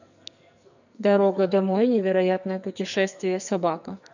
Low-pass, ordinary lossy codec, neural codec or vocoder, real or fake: 7.2 kHz; none; codec, 44.1 kHz, 2.6 kbps, SNAC; fake